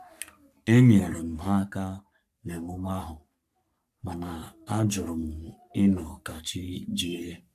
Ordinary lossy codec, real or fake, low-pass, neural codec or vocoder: AAC, 96 kbps; fake; 14.4 kHz; codec, 44.1 kHz, 3.4 kbps, Pupu-Codec